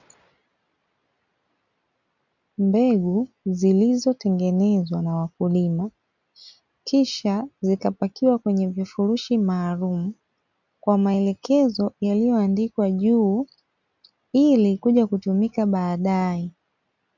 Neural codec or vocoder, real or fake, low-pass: none; real; 7.2 kHz